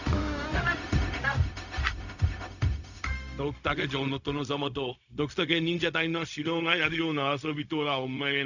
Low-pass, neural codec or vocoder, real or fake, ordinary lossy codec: 7.2 kHz; codec, 16 kHz, 0.4 kbps, LongCat-Audio-Codec; fake; none